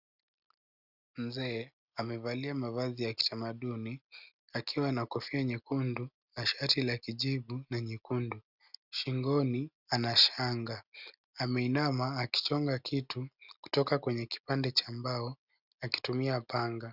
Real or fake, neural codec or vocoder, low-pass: real; none; 5.4 kHz